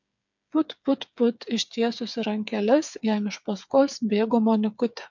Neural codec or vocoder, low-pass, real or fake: codec, 16 kHz, 8 kbps, FreqCodec, smaller model; 7.2 kHz; fake